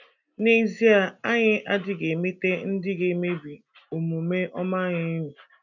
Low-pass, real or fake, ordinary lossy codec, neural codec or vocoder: 7.2 kHz; real; none; none